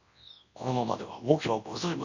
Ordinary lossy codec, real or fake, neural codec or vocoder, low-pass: none; fake; codec, 24 kHz, 0.9 kbps, WavTokenizer, large speech release; 7.2 kHz